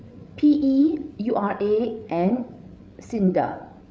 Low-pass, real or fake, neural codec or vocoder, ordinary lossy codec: none; fake; codec, 16 kHz, 8 kbps, FreqCodec, larger model; none